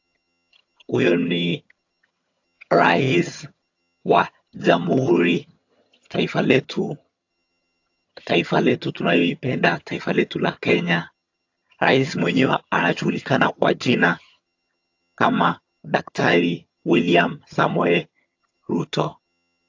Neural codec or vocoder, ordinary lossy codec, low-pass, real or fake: vocoder, 22.05 kHz, 80 mel bands, HiFi-GAN; AAC, 48 kbps; 7.2 kHz; fake